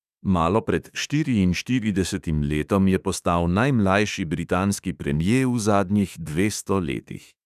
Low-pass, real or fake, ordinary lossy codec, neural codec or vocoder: 14.4 kHz; fake; none; autoencoder, 48 kHz, 32 numbers a frame, DAC-VAE, trained on Japanese speech